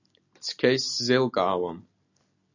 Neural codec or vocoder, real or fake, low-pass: none; real; 7.2 kHz